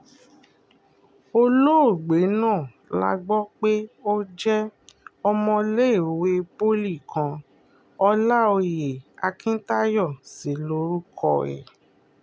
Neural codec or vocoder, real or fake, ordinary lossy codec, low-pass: none; real; none; none